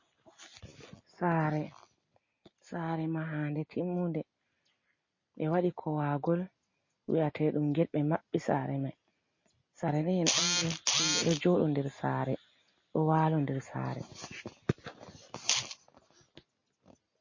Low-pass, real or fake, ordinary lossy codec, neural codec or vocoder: 7.2 kHz; real; MP3, 32 kbps; none